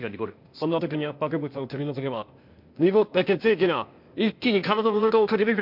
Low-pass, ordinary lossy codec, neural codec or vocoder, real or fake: 5.4 kHz; MP3, 48 kbps; codec, 16 kHz, 0.8 kbps, ZipCodec; fake